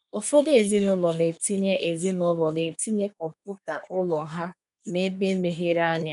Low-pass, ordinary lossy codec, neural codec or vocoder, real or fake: 10.8 kHz; none; codec, 24 kHz, 1 kbps, SNAC; fake